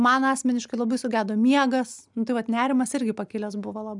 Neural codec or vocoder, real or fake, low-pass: none; real; 10.8 kHz